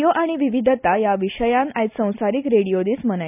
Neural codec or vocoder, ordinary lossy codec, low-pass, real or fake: none; none; 3.6 kHz; real